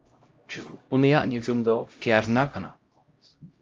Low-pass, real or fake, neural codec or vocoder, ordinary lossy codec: 7.2 kHz; fake; codec, 16 kHz, 0.5 kbps, X-Codec, HuBERT features, trained on LibriSpeech; Opus, 32 kbps